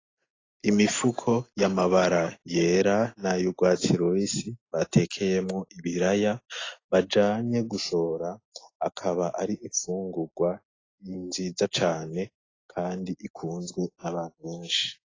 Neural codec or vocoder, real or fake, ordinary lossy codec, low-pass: none; real; AAC, 32 kbps; 7.2 kHz